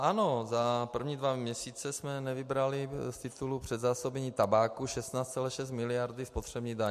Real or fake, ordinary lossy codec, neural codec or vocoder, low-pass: real; MP3, 64 kbps; none; 14.4 kHz